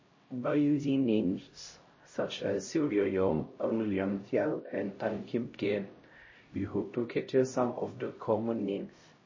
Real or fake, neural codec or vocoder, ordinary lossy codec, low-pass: fake; codec, 16 kHz, 0.5 kbps, X-Codec, HuBERT features, trained on LibriSpeech; MP3, 32 kbps; 7.2 kHz